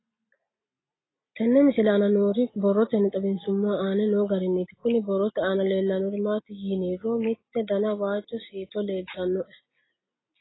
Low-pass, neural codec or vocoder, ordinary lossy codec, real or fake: 7.2 kHz; none; AAC, 16 kbps; real